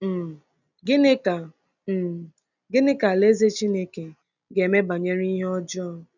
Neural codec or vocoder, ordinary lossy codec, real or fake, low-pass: none; none; real; 7.2 kHz